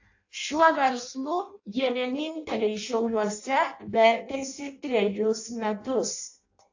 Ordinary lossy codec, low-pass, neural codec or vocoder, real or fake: AAC, 48 kbps; 7.2 kHz; codec, 16 kHz in and 24 kHz out, 0.6 kbps, FireRedTTS-2 codec; fake